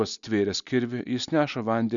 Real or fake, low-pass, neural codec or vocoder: real; 7.2 kHz; none